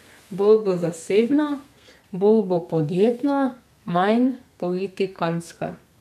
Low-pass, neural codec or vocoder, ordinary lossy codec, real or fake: 14.4 kHz; codec, 32 kHz, 1.9 kbps, SNAC; none; fake